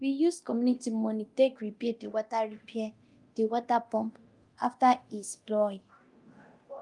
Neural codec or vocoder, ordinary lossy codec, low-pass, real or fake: codec, 24 kHz, 0.9 kbps, DualCodec; Opus, 32 kbps; 10.8 kHz; fake